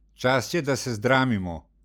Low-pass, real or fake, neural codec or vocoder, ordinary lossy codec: none; real; none; none